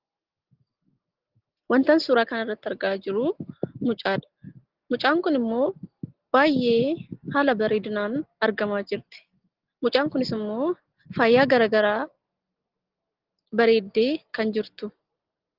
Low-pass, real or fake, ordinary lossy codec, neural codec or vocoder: 5.4 kHz; real; Opus, 16 kbps; none